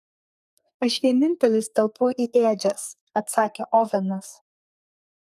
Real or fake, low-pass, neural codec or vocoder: fake; 14.4 kHz; codec, 44.1 kHz, 2.6 kbps, SNAC